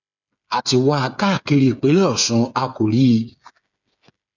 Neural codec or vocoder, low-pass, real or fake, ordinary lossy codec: codec, 16 kHz, 8 kbps, FreqCodec, smaller model; 7.2 kHz; fake; none